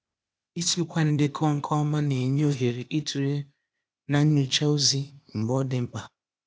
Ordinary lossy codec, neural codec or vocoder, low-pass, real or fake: none; codec, 16 kHz, 0.8 kbps, ZipCodec; none; fake